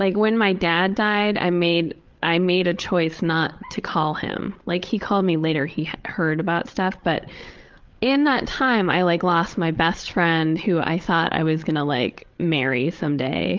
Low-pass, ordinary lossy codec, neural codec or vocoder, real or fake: 7.2 kHz; Opus, 32 kbps; codec, 16 kHz, 8 kbps, FreqCodec, larger model; fake